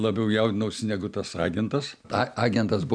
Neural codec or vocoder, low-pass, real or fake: none; 9.9 kHz; real